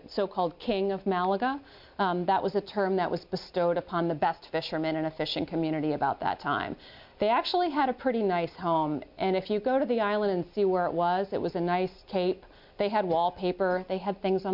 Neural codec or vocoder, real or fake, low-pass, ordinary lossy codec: none; real; 5.4 kHz; MP3, 48 kbps